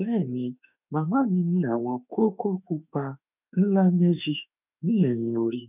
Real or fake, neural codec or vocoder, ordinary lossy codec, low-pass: fake; autoencoder, 48 kHz, 32 numbers a frame, DAC-VAE, trained on Japanese speech; none; 3.6 kHz